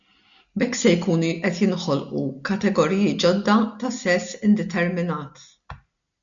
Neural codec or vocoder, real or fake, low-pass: none; real; 7.2 kHz